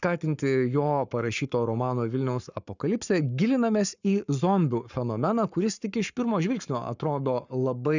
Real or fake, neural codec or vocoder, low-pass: fake; codec, 44.1 kHz, 7.8 kbps, Pupu-Codec; 7.2 kHz